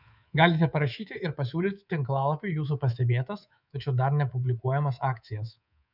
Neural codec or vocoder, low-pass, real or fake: codec, 24 kHz, 3.1 kbps, DualCodec; 5.4 kHz; fake